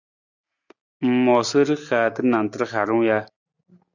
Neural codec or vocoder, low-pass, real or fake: none; 7.2 kHz; real